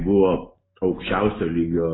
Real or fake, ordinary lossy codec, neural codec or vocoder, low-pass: real; AAC, 16 kbps; none; 7.2 kHz